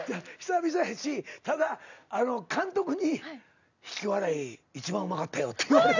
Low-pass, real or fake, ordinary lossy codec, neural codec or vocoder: 7.2 kHz; real; none; none